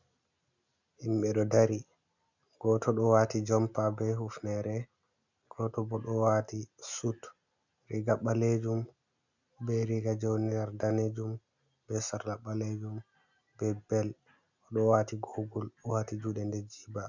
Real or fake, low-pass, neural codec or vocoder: real; 7.2 kHz; none